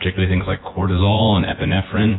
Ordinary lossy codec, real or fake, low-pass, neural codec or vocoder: AAC, 16 kbps; fake; 7.2 kHz; vocoder, 24 kHz, 100 mel bands, Vocos